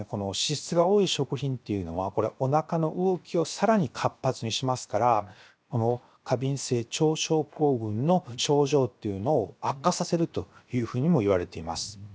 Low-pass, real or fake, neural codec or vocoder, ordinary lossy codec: none; fake; codec, 16 kHz, 0.7 kbps, FocalCodec; none